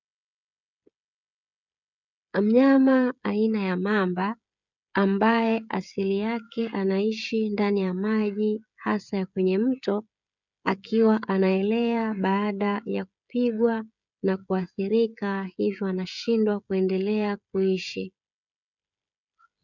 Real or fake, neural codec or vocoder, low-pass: fake; codec, 16 kHz, 16 kbps, FreqCodec, smaller model; 7.2 kHz